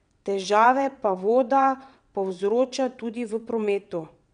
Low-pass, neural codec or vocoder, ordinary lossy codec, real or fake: 9.9 kHz; vocoder, 22.05 kHz, 80 mel bands, WaveNeXt; none; fake